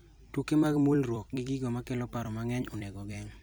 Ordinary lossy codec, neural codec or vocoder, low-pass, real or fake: none; vocoder, 44.1 kHz, 128 mel bands every 256 samples, BigVGAN v2; none; fake